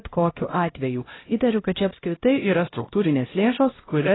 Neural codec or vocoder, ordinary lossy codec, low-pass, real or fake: codec, 16 kHz, 0.5 kbps, X-Codec, HuBERT features, trained on LibriSpeech; AAC, 16 kbps; 7.2 kHz; fake